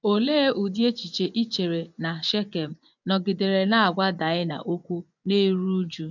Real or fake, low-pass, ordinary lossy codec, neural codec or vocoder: fake; 7.2 kHz; none; vocoder, 24 kHz, 100 mel bands, Vocos